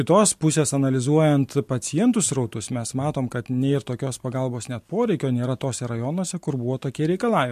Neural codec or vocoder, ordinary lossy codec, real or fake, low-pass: none; MP3, 64 kbps; real; 14.4 kHz